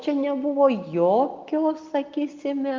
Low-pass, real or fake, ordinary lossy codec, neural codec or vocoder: 7.2 kHz; real; Opus, 24 kbps; none